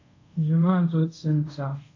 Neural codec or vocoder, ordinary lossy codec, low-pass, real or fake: codec, 24 kHz, 0.5 kbps, DualCodec; AAC, 48 kbps; 7.2 kHz; fake